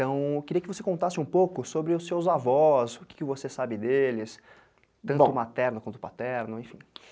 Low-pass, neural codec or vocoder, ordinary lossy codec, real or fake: none; none; none; real